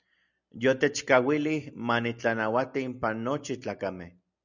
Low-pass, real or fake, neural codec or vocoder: 7.2 kHz; real; none